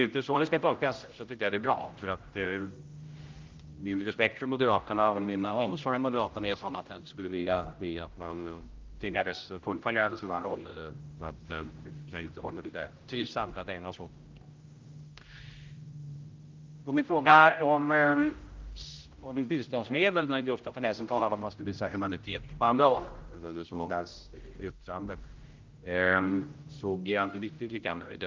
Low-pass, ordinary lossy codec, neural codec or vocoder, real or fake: 7.2 kHz; Opus, 24 kbps; codec, 16 kHz, 0.5 kbps, X-Codec, HuBERT features, trained on general audio; fake